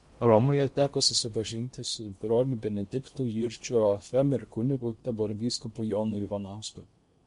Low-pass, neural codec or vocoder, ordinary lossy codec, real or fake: 10.8 kHz; codec, 16 kHz in and 24 kHz out, 0.8 kbps, FocalCodec, streaming, 65536 codes; MP3, 64 kbps; fake